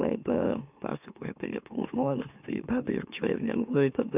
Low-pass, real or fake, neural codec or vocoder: 3.6 kHz; fake; autoencoder, 44.1 kHz, a latent of 192 numbers a frame, MeloTTS